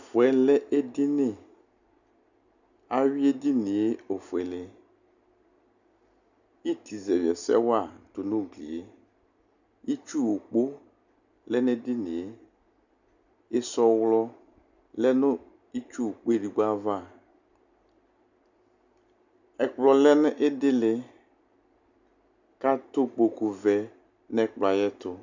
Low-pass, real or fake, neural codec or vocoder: 7.2 kHz; real; none